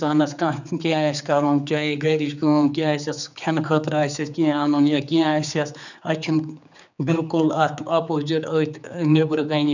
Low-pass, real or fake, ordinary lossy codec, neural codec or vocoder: 7.2 kHz; fake; none; codec, 16 kHz, 4 kbps, X-Codec, HuBERT features, trained on general audio